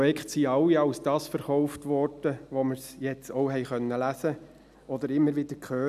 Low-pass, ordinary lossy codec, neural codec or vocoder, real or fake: 14.4 kHz; none; none; real